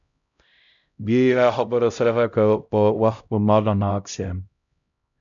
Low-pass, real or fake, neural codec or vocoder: 7.2 kHz; fake; codec, 16 kHz, 0.5 kbps, X-Codec, HuBERT features, trained on LibriSpeech